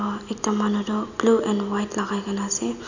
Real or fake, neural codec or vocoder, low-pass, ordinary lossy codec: real; none; 7.2 kHz; none